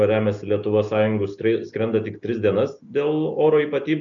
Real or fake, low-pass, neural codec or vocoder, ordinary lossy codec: real; 7.2 kHz; none; MP3, 96 kbps